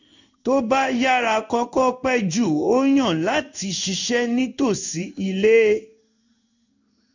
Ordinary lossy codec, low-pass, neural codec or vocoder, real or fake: none; 7.2 kHz; codec, 16 kHz in and 24 kHz out, 1 kbps, XY-Tokenizer; fake